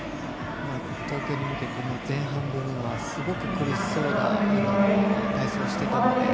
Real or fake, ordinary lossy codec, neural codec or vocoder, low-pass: real; none; none; none